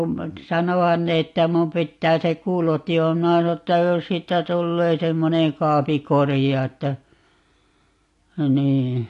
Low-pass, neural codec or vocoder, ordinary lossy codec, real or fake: 10.8 kHz; none; AAC, 48 kbps; real